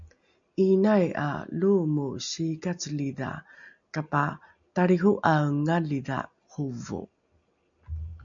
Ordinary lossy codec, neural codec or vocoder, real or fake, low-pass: MP3, 96 kbps; none; real; 7.2 kHz